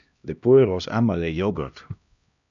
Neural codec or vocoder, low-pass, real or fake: codec, 16 kHz, 1 kbps, X-Codec, HuBERT features, trained on LibriSpeech; 7.2 kHz; fake